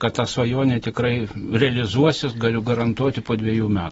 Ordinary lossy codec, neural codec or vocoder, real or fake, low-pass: AAC, 24 kbps; none; real; 10.8 kHz